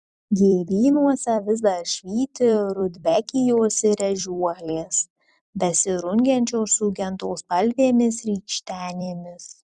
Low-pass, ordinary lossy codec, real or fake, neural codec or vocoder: 10.8 kHz; Opus, 64 kbps; fake; vocoder, 44.1 kHz, 128 mel bands every 256 samples, BigVGAN v2